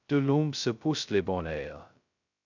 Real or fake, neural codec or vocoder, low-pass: fake; codec, 16 kHz, 0.2 kbps, FocalCodec; 7.2 kHz